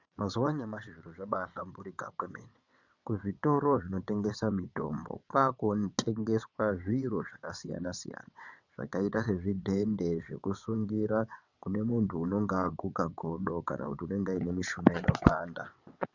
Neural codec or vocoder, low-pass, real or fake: vocoder, 22.05 kHz, 80 mel bands, WaveNeXt; 7.2 kHz; fake